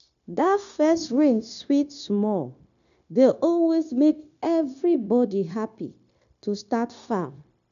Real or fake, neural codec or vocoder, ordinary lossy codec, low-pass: fake; codec, 16 kHz, 0.9 kbps, LongCat-Audio-Codec; none; 7.2 kHz